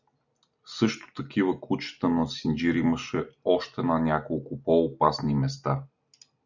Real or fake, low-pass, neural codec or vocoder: real; 7.2 kHz; none